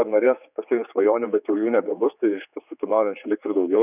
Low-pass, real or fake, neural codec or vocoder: 3.6 kHz; fake; codec, 16 kHz, 4 kbps, X-Codec, HuBERT features, trained on general audio